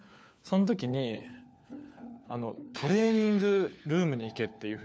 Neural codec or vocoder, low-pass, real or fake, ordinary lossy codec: codec, 16 kHz, 4 kbps, FunCodec, trained on LibriTTS, 50 frames a second; none; fake; none